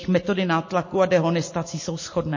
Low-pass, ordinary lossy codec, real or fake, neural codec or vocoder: 7.2 kHz; MP3, 32 kbps; real; none